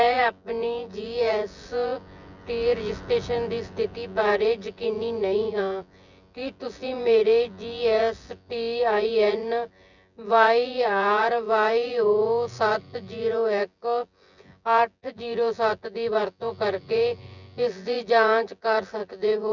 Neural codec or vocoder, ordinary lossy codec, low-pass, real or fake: vocoder, 24 kHz, 100 mel bands, Vocos; none; 7.2 kHz; fake